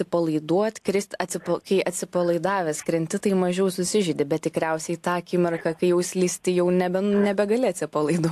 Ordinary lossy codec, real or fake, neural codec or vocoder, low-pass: AAC, 64 kbps; real; none; 14.4 kHz